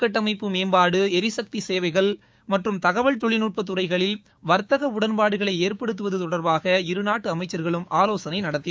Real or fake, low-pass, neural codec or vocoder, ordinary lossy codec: fake; none; codec, 16 kHz, 6 kbps, DAC; none